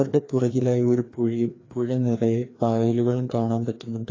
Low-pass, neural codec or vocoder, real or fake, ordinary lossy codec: 7.2 kHz; codec, 16 kHz, 2 kbps, FreqCodec, larger model; fake; AAC, 32 kbps